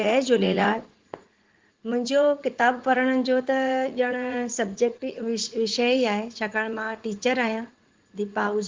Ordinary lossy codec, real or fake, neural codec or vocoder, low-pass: Opus, 16 kbps; fake; vocoder, 44.1 kHz, 80 mel bands, Vocos; 7.2 kHz